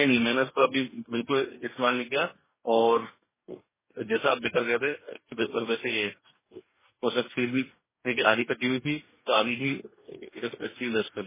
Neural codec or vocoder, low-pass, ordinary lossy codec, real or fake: codec, 44.1 kHz, 2.6 kbps, DAC; 3.6 kHz; MP3, 16 kbps; fake